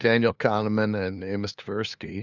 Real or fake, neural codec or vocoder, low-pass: fake; codec, 16 kHz, 2 kbps, FunCodec, trained on LibriTTS, 25 frames a second; 7.2 kHz